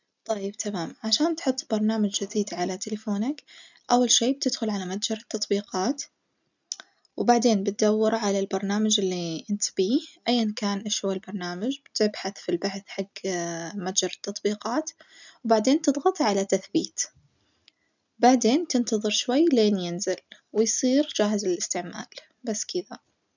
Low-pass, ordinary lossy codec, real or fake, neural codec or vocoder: 7.2 kHz; none; real; none